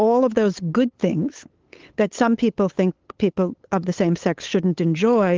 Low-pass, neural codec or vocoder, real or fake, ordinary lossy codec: 7.2 kHz; codec, 16 kHz, 8 kbps, FunCodec, trained on LibriTTS, 25 frames a second; fake; Opus, 16 kbps